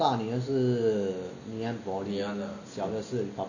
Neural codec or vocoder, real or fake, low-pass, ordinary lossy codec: none; real; 7.2 kHz; none